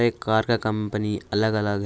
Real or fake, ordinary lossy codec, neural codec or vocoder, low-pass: real; none; none; none